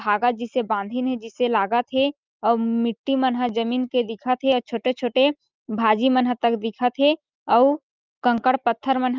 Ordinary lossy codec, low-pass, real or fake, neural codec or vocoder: Opus, 32 kbps; 7.2 kHz; real; none